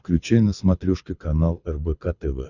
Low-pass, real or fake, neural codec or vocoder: 7.2 kHz; fake; codec, 24 kHz, 6 kbps, HILCodec